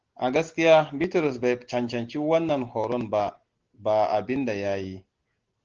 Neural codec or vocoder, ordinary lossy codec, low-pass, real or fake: none; Opus, 16 kbps; 7.2 kHz; real